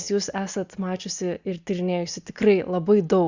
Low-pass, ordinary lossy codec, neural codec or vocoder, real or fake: 7.2 kHz; Opus, 64 kbps; none; real